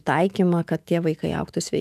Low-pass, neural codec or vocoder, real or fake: 14.4 kHz; autoencoder, 48 kHz, 128 numbers a frame, DAC-VAE, trained on Japanese speech; fake